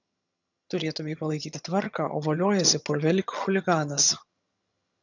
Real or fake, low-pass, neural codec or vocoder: fake; 7.2 kHz; vocoder, 22.05 kHz, 80 mel bands, HiFi-GAN